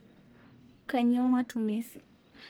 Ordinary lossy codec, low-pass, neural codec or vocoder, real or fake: none; none; codec, 44.1 kHz, 1.7 kbps, Pupu-Codec; fake